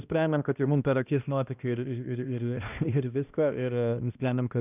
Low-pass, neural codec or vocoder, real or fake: 3.6 kHz; codec, 16 kHz, 1 kbps, X-Codec, HuBERT features, trained on balanced general audio; fake